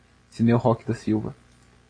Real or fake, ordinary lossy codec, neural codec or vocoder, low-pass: real; AAC, 32 kbps; none; 9.9 kHz